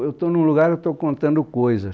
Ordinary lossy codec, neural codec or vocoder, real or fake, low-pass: none; none; real; none